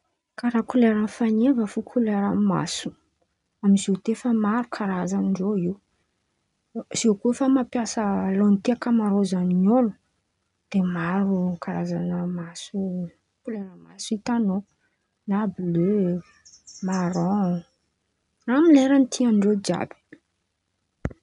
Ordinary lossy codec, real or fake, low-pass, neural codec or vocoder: none; real; 10.8 kHz; none